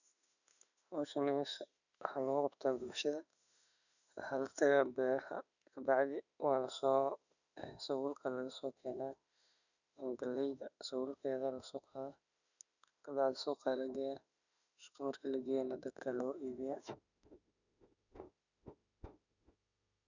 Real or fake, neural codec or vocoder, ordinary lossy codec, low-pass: fake; autoencoder, 48 kHz, 32 numbers a frame, DAC-VAE, trained on Japanese speech; none; 7.2 kHz